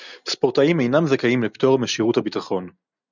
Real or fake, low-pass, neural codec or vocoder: real; 7.2 kHz; none